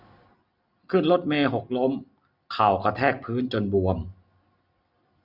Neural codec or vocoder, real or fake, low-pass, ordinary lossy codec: none; real; 5.4 kHz; none